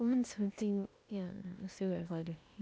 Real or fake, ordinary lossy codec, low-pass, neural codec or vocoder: fake; none; none; codec, 16 kHz, 0.8 kbps, ZipCodec